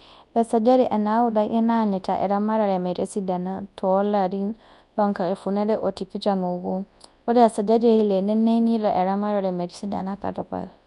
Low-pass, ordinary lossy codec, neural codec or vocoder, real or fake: 10.8 kHz; none; codec, 24 kHz, 0.9 kbps, WavTokenizer, large speech release; fake